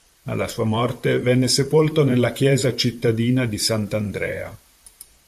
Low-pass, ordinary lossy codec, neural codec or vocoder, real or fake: 14.4 kHz; MP3, 96 kbps; vocoder, 44.1 kHz, 128 mel bands, Pupu-Vocoder; fake